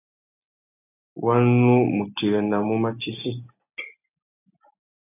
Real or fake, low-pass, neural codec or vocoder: real; 3.6 kHz; none